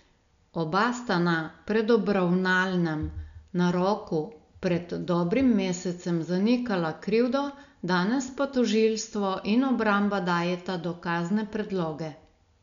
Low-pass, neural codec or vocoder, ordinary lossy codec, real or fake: 7.2 kHz; none; none; real